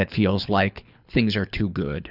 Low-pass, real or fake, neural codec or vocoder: 5.4 kHz; fake; codec, 24 kHz, 6 kbps, HILCodec